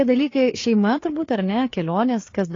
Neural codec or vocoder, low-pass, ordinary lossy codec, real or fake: codec, 16 kHz, 4 kbps, FreqCodec, larger model; 7.2 kHz; AAC, 32 kbps; fake